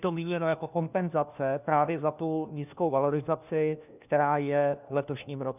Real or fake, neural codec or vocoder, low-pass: fake; codec, 16 kHz, 1 kbps, FunCodec, trained on LibriTTS, 50 frames a second; 3.6 kHz